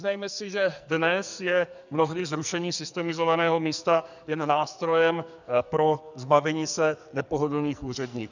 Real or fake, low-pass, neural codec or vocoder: fake; 7.2 kHz; codec, 44.1 kHz, 2.6 kbps, SNAC